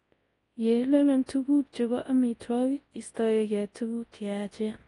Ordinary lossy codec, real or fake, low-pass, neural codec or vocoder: AAC, 32 kbps; fake; 10.8 kHz; codec, 24 kHz, 0.9 kbps, WavTokenizer, large speech release